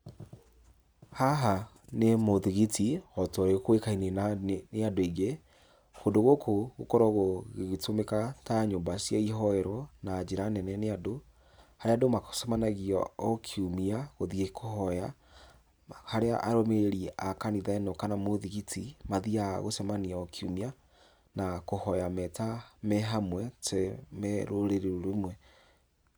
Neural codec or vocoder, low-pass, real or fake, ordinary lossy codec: none; none; real; none